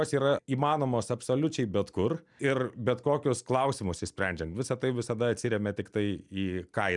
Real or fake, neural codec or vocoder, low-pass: real; none; 10.8 kHz